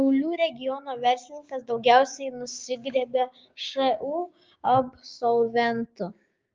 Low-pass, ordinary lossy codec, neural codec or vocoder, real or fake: 7.2 kHz; Opus, 24 kbps; codec, 16 kHz, 6 kbps, DAC; fake